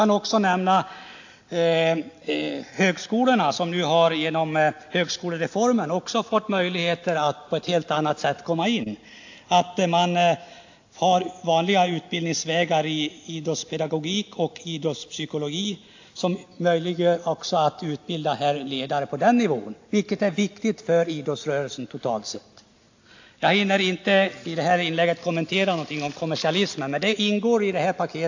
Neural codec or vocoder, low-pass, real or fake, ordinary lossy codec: none; 7.2 kHz; real; AAC, 48 kbps